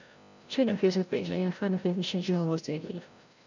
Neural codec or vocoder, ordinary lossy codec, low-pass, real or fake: codec, 16 kHz, 0.5 kbps, FreqCodec, larger model; none; 7.2 kHz; fake